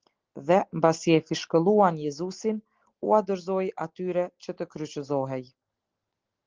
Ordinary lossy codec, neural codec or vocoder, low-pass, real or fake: Opus, 16 kbps; none; 7.2 kHz; real